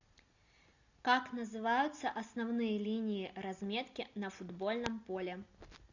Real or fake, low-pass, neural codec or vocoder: real; 7.2 kHz; none